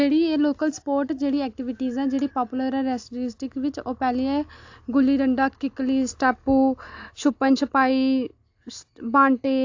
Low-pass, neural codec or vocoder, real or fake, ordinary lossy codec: 7.2 kHz; none; real; AAC, 48 kbps